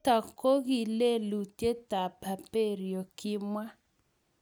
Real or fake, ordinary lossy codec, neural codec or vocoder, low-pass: real; none; none; none